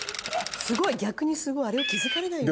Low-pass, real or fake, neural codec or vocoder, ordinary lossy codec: none; real; none; none